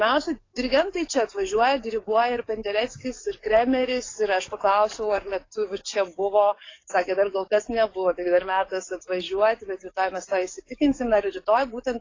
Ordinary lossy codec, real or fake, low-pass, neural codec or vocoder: AAC, 32 kbps; fake; 7.2 kHz; codec, 44.1 kHz, 7.8 kbps, DAC